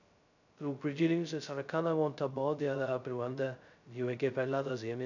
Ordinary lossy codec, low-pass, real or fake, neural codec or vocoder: none; 7.2 kHz; fake; codec, 16 kHz, 0.2 kbps, FocalCodec